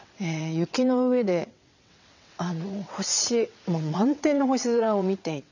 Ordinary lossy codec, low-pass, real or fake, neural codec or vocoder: none; 7.2 kHz; fake; vocoder, 22.05 kHz, 80 mel bands, WaveNeXt